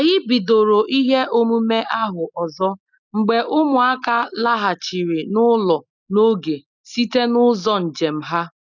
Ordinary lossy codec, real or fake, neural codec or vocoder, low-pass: none; real; none; 7.2 kHz